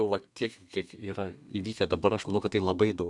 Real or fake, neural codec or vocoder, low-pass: fake; codec, 32 kHz, 1.9 kbps, SNAC; 10.8 kHz